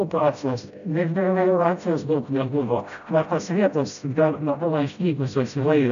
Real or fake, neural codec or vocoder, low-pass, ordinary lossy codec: fake; codec, 16 kHz, 0.5 kbps, FreqCodec, smaller model; 7.2 kHz; AAC, 96 kbps